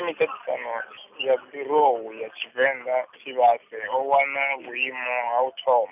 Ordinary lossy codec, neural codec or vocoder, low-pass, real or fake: none; none; 3.6 kHz; real